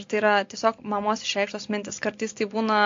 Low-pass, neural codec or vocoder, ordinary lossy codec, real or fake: 7.2 kHz; none; AAC, 48 kbps; real